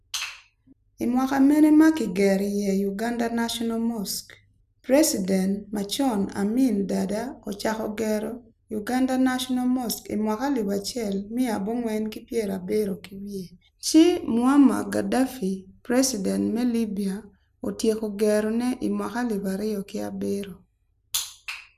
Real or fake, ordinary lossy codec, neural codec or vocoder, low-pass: real; none; none; 14.4 kHz